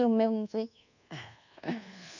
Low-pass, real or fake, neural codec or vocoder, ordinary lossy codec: 7.2 kHz; fake; codec, 24 kHz, 1.2 kbps, DualCodec; none